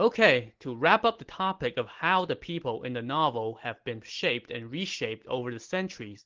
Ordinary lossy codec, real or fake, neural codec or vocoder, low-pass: Opus, 16 kbps; real; none; 7.2 kHz